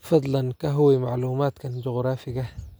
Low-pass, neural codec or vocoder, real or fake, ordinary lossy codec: none; none; real; none